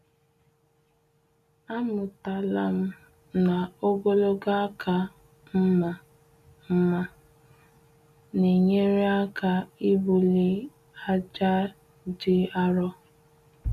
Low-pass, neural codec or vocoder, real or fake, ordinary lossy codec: 14.4 kHz; none; real; none